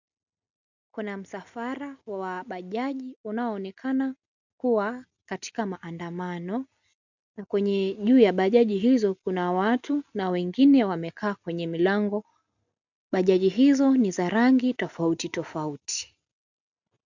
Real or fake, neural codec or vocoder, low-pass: real; none; 7.2 kHz